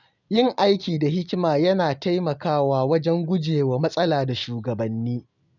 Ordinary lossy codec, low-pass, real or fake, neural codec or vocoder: none; 7.2 kHz; real; none